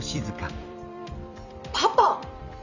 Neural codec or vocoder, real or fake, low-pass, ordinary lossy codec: vocoder, 44.1 kHz, 128 mel bands every 256 samples, BigVGAN v2; fake; 7.2 kHz; none